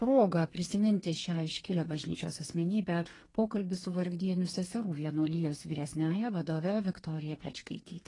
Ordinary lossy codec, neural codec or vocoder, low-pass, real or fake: AAC, 32 kbps; codec, 32 kHz, 1.9 kbps, SNAC; 10.8 kHz; fake